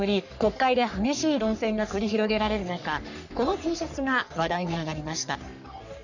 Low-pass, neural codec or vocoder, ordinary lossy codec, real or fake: 7.2 kHz; codec, 44.1 kHz, 3.4 kbps, Pupu-Codec; none; fake